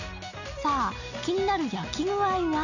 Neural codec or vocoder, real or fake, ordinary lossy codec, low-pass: none; real; none; 7.2 kHz